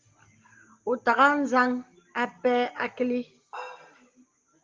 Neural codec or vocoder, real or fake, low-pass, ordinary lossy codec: none; real; 7.2 kHz; Opus, 32 kbps